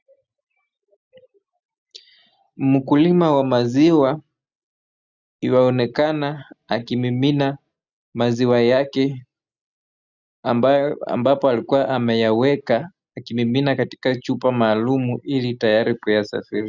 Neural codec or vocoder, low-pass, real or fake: none; 7.2 kHz; real